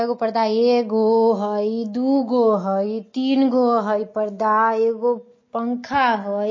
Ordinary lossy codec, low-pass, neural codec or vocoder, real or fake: MP3, 32 kbps; 7.2 kHz; none; real